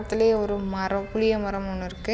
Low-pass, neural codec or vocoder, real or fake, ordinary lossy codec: none; none; real; none